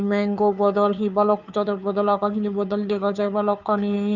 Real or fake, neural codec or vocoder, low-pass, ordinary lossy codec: fake; codec, 16 kHz, 2 kbps, FunCodec, trained on Chinese and English, 25 frames a second; 7.2 kHz; none